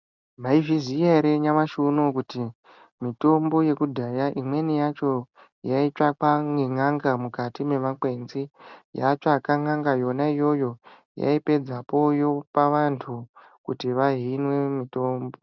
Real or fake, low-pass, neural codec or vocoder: real; 7.2 kHz; none